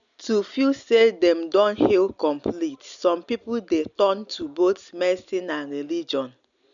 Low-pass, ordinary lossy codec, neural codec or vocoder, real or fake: 7.2 kHz; none; none; real